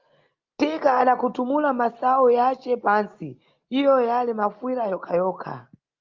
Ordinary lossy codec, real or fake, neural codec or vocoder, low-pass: Opus, 24 kbps; real; none; 7.2 kHz